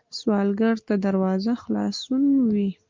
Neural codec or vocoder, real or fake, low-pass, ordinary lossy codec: none; real; 7.2 kHz; Opus, 24 kbps